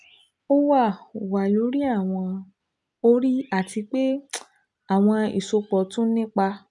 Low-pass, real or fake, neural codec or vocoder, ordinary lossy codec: 10.8 kHz; real; none; none